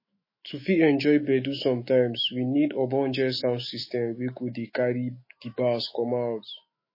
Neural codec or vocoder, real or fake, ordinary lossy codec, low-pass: autoencoder, 48 kHz, 128 numbers a frame, DAC-VAE, trained on Japanese speech; fake; MP3, 24 kbps; 5.4 kHz